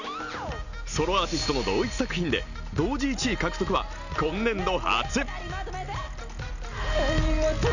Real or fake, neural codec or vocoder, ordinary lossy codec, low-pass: real; none; none; 7.2 kHz